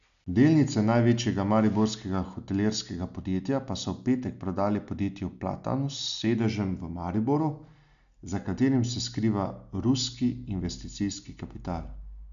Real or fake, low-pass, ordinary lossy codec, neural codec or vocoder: real; 7.2 kHz; none; none